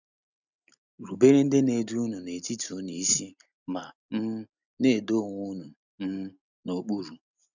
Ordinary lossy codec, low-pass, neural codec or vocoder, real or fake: none; 7.2 kHz; none; real